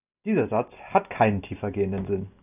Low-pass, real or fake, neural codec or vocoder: 3.6 kHz; real; none